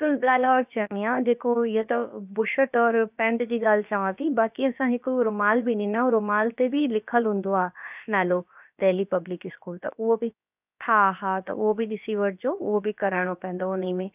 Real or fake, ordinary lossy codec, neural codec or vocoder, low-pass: fake; none; codec, 16 kHz, about 1 kbps, DyCAST, with the encoder's durations; 3.6 kHz